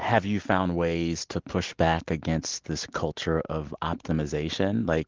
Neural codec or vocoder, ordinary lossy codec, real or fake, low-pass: none; Opus, 16 kbps; real; 7.2 kHz